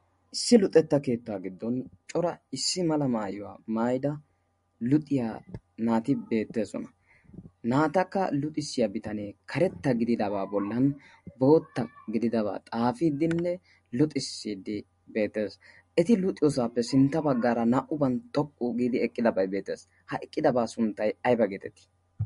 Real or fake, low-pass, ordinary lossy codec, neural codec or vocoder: real; 10.8 kHz; MP3, 48 kbps; none